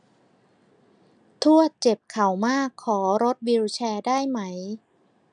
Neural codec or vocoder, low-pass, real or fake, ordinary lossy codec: none; 9.9 kHz; real; none